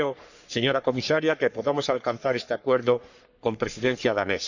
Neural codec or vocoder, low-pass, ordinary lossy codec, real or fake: codec, 44.1 kHz, 3.4 kbps, Pupu-Codec; 7.2 kHz; none; fake